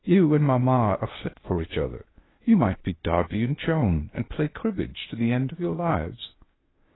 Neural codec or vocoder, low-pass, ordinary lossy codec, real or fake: codec, 16 kHz, 0.8 kbps, ZipCodec; 7.2 kHz; AAC, 16 kbps; fake